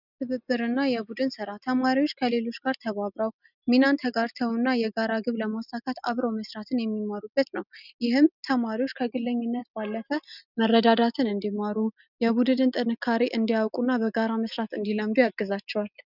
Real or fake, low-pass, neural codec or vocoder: real; 5.4 kHz; none